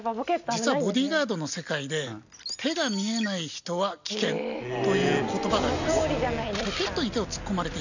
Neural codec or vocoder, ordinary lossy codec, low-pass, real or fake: none; none; 7.2 kHz; real